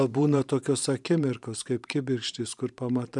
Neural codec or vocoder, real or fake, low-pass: vocoder, 44.1 kHz, 128 mel bands every 512 samples, BigVGAN v2; fake; 10.8 kHz